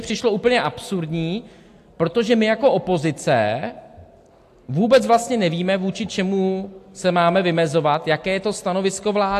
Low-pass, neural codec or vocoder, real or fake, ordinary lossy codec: 14.4 kHz; none; real; AAC, 64 kbps